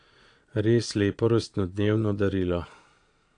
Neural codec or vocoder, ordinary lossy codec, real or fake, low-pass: vocoder, 22.05 kHz, 80 mel bands, Vocos; AAC, 64 kbps; fake; 9.9 kHz